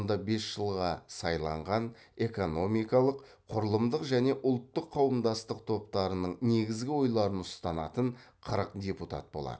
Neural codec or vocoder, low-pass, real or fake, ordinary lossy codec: none; none; real; none